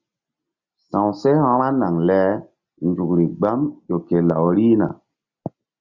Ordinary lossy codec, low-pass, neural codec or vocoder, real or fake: Opus, 64 kbps; 7.2 kHz; none; real